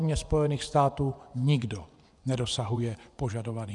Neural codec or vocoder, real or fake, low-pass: vocoder, 24 kHz, 100 mel bands, Vocos; fake; 10.8 kHz